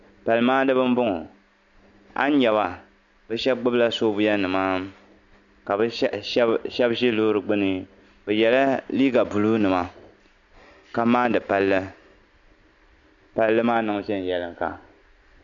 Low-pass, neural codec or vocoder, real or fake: 7.2 kHz; none; real